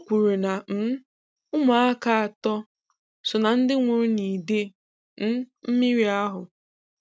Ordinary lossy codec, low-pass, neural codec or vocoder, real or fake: none; none; none; real